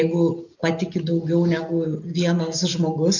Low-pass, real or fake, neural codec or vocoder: 7.2 kHz; real; none